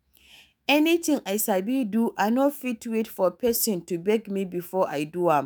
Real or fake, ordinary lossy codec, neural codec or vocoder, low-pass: fake; none; autoencoder, 48 kHz, 128 numbers a frame, DAC-VAE, trained on Japanese speech; none